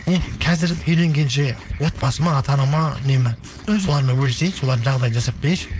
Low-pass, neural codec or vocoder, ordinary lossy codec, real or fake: none; codec, 16 kHz, 4.8 kbps, FACodec; none; fake